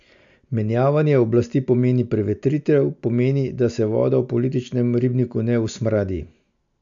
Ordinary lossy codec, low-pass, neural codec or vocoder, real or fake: MP3, 64 kbps; 7.2 kHz; none; real